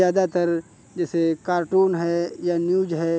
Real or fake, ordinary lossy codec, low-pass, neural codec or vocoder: real; none; none; none